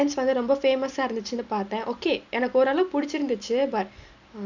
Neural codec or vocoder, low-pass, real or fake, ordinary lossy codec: none; 7.2 kHz; real; none